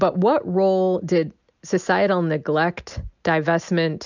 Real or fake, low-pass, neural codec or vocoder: real; 7.2 kHz; none